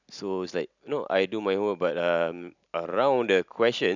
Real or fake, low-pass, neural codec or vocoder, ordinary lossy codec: real; 7.2 kHz; none; none